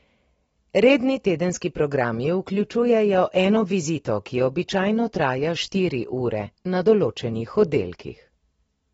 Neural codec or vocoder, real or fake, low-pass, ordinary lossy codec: none; real; 10.8 kHz; AAC, 24 kbps